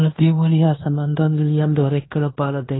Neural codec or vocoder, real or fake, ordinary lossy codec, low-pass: codec, 16 kHz in and 24 kHz out, 0.9 kbps, LongCat-Audio-Codec, fine tuned four codebook decoder; fake; AAC, 16 kbps; 7.2 kHz